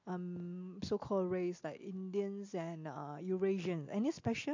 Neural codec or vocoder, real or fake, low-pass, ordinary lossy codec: none; real; 7.2 kHz; MP3, 48 kbps